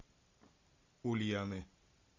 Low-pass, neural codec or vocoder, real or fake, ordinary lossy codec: 7.2 kHz; none; real; Opus, 64 kbps